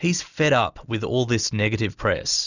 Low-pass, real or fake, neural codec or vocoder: 7.2 kHz; real; none